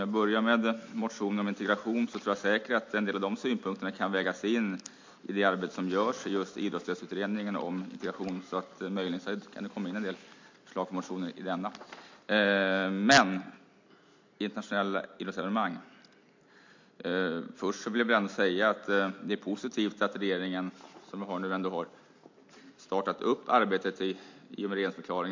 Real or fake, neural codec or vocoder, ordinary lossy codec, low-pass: real; none; MP3, 48 kbps; 7.2 kHz